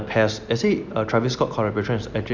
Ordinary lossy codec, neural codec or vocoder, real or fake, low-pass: none; none; real; 7.2 kHz